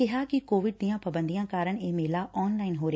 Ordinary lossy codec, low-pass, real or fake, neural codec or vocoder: none; none; real; none